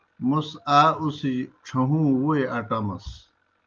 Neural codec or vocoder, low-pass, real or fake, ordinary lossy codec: none; 7.2 kHz; real; Opus, 24 kbps